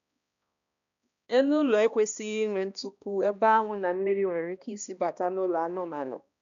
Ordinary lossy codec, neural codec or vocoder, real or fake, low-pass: none; codec, 16 kHz, 1 kbps, X-Codec, HuBERT features, trained on balanced general audio; fake; 7.2 kHz